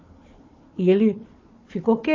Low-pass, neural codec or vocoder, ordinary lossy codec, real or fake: 7.2 kHz; codec, 16 kHz, 4 kbps, FunCodec, trained on Chinese and English, 50 frames a second; MP3, 32 kbps; fake